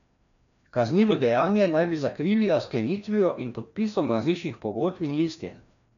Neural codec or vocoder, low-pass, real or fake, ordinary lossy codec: codec, 16 kHz, 1 kbps, FreqCodec, larger model; 7.2 kHz; fake; none